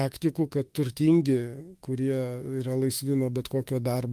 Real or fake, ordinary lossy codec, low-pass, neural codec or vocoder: fake; Opus, 32 kbps; 14.4 kHz; autoencoder, 48 kHz, 32 numbers a frame, DAC-VAE, trained on Japanese speech